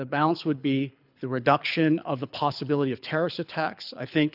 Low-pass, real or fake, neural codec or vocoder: 5.4 kHz; fake; codec, 24 kHz, 6 kbps, HILCodec